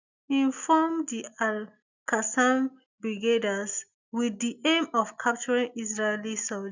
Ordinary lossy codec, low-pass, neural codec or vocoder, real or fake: none; 7.2 kHz; none; real